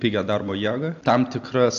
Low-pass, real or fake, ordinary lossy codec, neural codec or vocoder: 7.2 kHz; real; AAC, 64 kbps; none